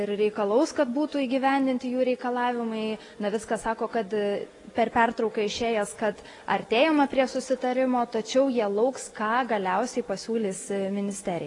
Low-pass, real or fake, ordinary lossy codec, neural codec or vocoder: 10.8 kHz; real; AAC, 32 kbps; none